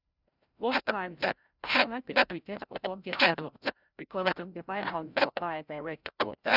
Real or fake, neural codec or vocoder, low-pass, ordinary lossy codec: fake; codec, 16 kHz, 0.5 kbps, FreqCodec, larger model; 5.4 kHz; none